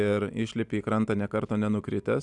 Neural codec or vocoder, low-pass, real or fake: none; 10.8 kHz; real